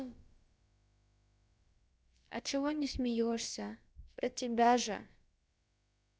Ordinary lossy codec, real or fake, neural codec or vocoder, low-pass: none; fake; codec, 16 kHz, about 1 kbps, DyCAST, with the encoder's durations; none